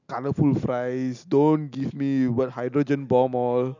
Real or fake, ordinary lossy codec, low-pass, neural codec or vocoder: real; none; 7.2 kHz; none